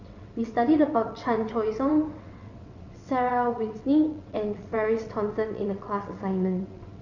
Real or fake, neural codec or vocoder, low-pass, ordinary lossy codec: fake; vocoder, 22.05 kHz, 80 mel bands, WaveNeXt; 7.2 kHz; none